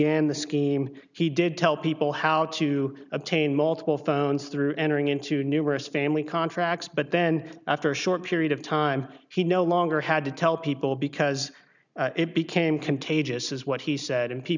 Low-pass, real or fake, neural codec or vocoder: 7.2 kHz; real; none